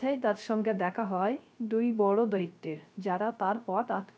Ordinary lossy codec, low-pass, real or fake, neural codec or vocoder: none; none; fake; codec, 16 kHz, 0.3 kbps, FocalCodec